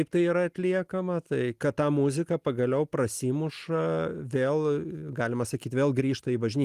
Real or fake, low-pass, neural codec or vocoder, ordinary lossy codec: real; 14.4 kHz; none; Opus, 24 kbps